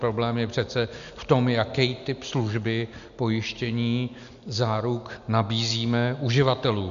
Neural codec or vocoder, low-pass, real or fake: none; 7.2 kHz; real